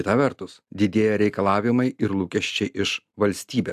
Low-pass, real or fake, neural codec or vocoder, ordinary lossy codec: 14.4 kHz; real; none; AAC, 96 kbps